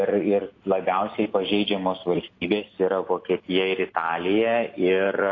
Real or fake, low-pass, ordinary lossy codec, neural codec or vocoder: real; 7.2 kHz; AAC, 32 kbps; none